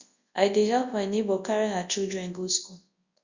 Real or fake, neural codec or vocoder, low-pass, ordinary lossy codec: fake; codec, 24 kHz, 0.9 kbps, WavTokenizer, large speech release; 7.2 kHz; Opus, 64 kbps